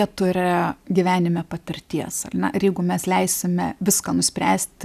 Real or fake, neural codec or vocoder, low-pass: real; none; 14.4 kHz